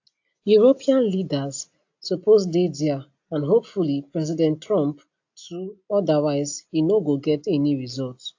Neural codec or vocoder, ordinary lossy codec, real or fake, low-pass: none; AAC, 48 kbps; real; 7.2 kHz